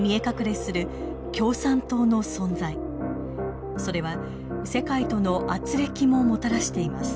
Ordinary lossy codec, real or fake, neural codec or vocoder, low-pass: none; real; none; none